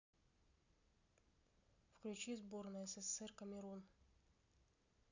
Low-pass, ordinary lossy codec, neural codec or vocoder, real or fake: 7.2 kHz; none; none; real